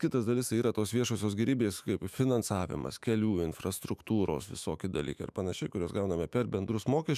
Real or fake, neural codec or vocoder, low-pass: fake; autoencoder, 48 kHz, 128 numbers a frame, DAC-VAE, trained on Japanese speech; 14.4 kHz